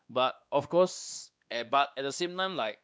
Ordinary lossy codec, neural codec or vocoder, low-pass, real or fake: none; codec, 16 kHz, 2 kbps, X-Codec, WavLM features, trained on Multilingual LibriSpeech; none; fake